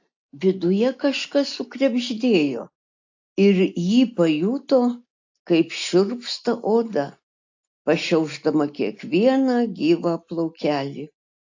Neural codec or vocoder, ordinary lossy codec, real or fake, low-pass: none; AAC, 48 kbps; real; 7.2 kHz